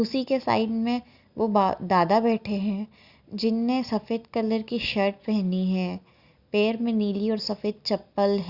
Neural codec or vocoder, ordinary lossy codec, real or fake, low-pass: none; Opus, 64 kbps; real; 5.4 kHz